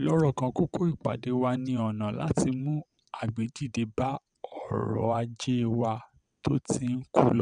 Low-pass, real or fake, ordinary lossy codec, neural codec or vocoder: 9.9 kHz; fake; none; vocoder, 22.05 kHz, 80 mel bands, WaveNeXt